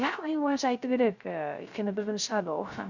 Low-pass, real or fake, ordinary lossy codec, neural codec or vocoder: 7.2 kHz; fake; Opus, 64 kbps; codec, 16 kHz, 0.3 kbps, FocalCodec